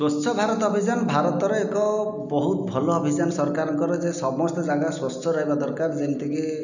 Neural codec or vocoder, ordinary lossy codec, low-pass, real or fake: none; none; 7.2 kHz; real